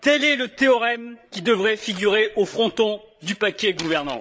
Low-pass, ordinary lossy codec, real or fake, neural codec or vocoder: none; none; fake; codec, 16 kHz, 8 kbps, FreqCodec, larger model